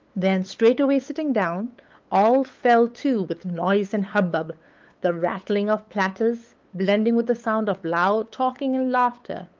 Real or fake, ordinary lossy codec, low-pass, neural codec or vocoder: fake; Opus, 24 kbps; 7.2 kHz; codec, 16 kHz, 8 kbps, FunCodec, trained on LibriTTS, 25 frames a second